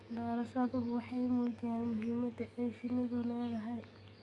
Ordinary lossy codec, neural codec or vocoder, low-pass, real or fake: none; codec, 44.1 kHz, 7.8 kbps, DAC; 10.8 kHz; fake